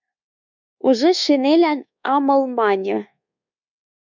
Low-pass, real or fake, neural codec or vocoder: 7.2 kHz; fake; codec, 24 kHz, 1.2 kbps, DualCodec